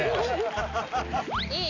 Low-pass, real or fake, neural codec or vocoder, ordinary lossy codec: 7.2 kHz; real; none; AAC, 48 kbps